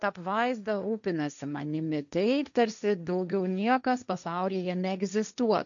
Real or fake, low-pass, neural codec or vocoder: fake; 7.2 kHz; codec, 16 kHz, 1.1 kbps, Voila-Tokenizer